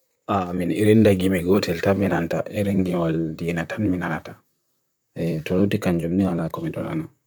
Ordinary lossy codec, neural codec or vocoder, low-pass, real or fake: none; vocoder, 44.1 kHz, 128 mel bands, Pupu-Vocoder; none; fake